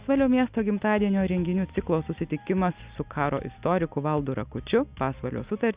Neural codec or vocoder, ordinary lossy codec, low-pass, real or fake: none; Opus, 64 kbps; 3.6 kHz; real